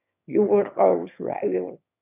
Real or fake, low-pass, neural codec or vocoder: fake; 3.6 kHz; autoencoder, 22.05 kHz, a latent of 192 numbers a frame, VITS, trained on one speaker